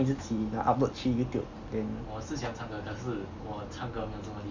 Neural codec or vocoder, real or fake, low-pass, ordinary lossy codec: none; real; 7.2 kHz; none